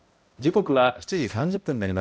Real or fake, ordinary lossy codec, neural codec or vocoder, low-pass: fake; none; codec, 16 kHz, 0.5 kbps, X-Codec, HuBERT features, trained on balanced general audio; none